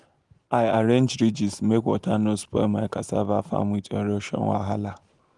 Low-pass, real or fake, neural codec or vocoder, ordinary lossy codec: 10.8 kHz; real; none; Opus, 24 kbps